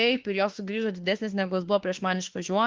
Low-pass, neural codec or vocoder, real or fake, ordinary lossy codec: 7.2 kHz; autoencoder, 48 kHz, 32 numbers a frame, DAC-VAE, trained on Japanese speech; fake; Opus, 16 kbps